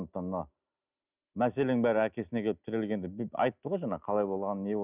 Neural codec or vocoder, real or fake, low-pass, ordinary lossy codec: none; real; 3.6 kHz; none